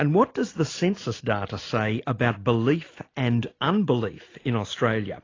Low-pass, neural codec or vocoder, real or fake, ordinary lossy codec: 7.2 kHz; none; real; AAC, 32 kbps